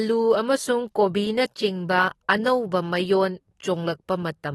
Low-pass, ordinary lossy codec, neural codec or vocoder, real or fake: 19.8 kHz; AAC, 32 kbps; codec, 44.1 kHz, 7.8 kbps, DAC; fake